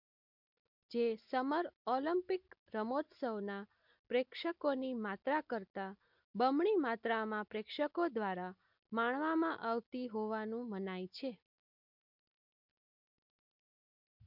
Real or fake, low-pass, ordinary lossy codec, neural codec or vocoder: real; 5.4 kHz; MP3, 48 kbps; none